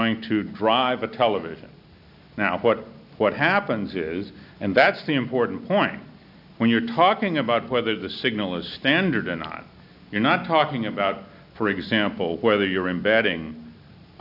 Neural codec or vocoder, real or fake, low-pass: none; real; 5.4 kHz